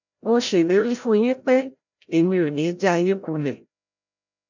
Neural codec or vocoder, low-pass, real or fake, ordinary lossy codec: codec, 16 kHz, 0.5 kbps, FreqCodec, larger model; 7.2 kHz; fake; none